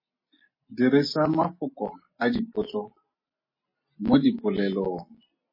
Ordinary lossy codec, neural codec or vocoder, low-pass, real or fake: MP3, 24 kbps; none; 5.4 kHz; real